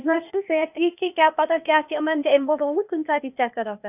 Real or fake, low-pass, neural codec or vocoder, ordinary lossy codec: fake; 3.6 kHz; codec, 16 kHz, 0.8 kbps, ZipCodec; none